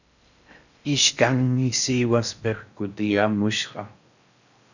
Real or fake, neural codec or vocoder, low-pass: fake; codec, 16 kHz in and 24 kHz out, 0.6 kbps, FocalCodec, streaming, 4096 codes; 7.2 kHz